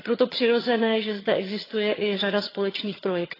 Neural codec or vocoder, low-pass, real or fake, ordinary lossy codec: vocoder, 22.05 kHz, 80 mel bands, HiFi-GAN; 5.4 kHz; fake; AAC, 24 kbps